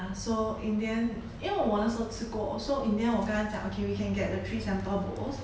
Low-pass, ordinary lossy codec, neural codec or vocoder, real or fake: none; none; none; real